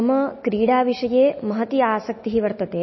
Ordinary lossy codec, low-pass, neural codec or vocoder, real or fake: MP3, 24 kbps; 7.2 kHz; none; real